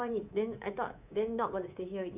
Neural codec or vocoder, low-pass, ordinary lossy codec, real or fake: codec, 24 kHz, 3.1 kbps, DualCodec; 3.6 kHz; none; fake